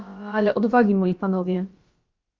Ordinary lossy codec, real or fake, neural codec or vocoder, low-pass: Opus, 32 kbps; fake; codec, 16 kHz, about 1 kbps, DyCAST, with the encoder's durations; 7.2 kHz